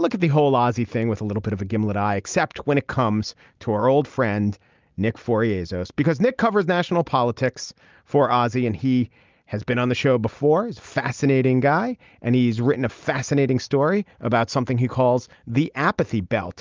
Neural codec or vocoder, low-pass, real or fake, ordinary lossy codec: none; 7.2 kHz; real; Opus, 24 kbps